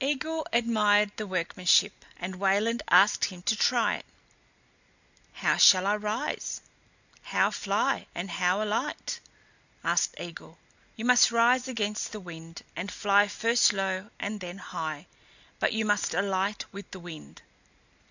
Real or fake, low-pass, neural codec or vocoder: real; 7.2 kHz; none